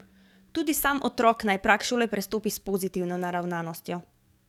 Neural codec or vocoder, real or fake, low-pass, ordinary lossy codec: codec, 44.1 kHz, 7.8 kbps, DAC; fake; 19.8 kHz; none